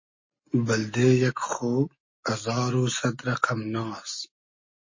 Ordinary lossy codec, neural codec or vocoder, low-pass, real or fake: MP3, 32 kbps; none; 7.2 kHz; real